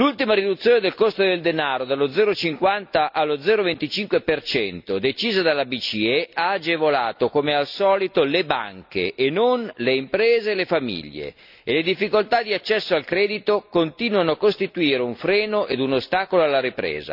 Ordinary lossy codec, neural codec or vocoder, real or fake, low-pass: none; none; real; 5.4 kHz